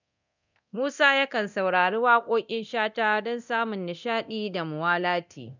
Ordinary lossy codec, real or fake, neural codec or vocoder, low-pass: none; fake; codec, 24 kHz, 0.9 kbps, DualCodec; 7.2 kHz